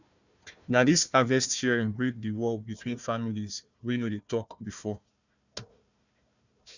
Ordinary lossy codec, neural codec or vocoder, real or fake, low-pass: none; codec, 16 kHz, 1 kbps, FunCodec, trained on Chinese and English, 50 frames a second; fake; 7.2 kHz